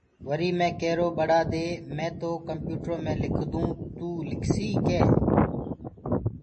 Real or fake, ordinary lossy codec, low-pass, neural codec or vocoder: real; MP3, 32 kbps; 10.8 kHz; none